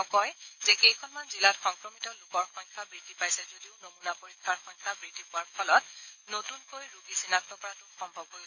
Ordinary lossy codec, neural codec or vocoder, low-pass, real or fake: none; autoencoder, 48 kHz, 128 numbers a frame, DAC-VAE, trained on Japanese speech; 7.2 kHz; fake